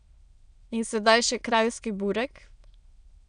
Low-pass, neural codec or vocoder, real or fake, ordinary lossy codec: 9.9 kHz; autoencoder, 22.05 kHz, a latent of 192 numbers a frame, VITS, trained on many speakers; fake; none